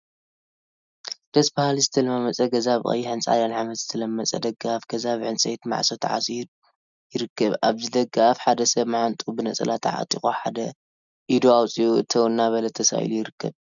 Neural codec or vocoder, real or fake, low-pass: none; real; 7.2 kHz